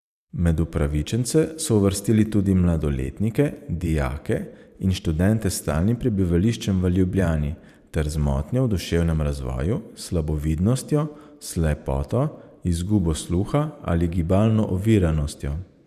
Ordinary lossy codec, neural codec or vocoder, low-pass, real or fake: none; vocoder, 44.1 kHz, 128 mel bands every 512 samples, BigVGAN v2; 14.4 kHz; fake